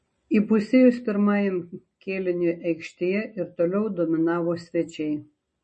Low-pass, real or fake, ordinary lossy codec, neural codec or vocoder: 10.8 kHz; real; MP3, 32 kbps; none